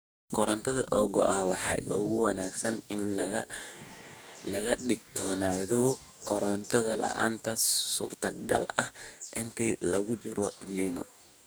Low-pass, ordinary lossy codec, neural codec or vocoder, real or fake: none; none; codec, 44.1 kHz, 2.6 kbps, DAC; fake